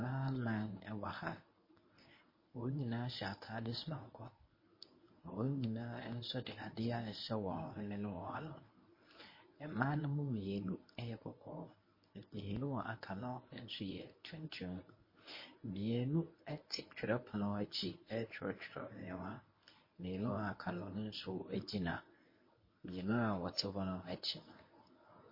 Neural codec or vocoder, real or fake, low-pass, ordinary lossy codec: codec, 24 kHz, 0.9 kbps, WavTokenizer, medium speech release version 1; fake; 5.4 kHz; MP3, 24 kbps